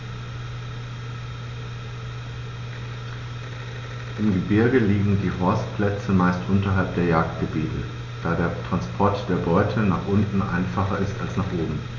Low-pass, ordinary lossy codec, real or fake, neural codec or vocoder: 7.2 kHz; none; real; none